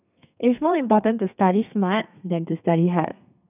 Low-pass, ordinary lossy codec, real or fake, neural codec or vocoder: 3.6 kHz; none; fake; codec, 32 kHz, 1.9 kbps, SNAC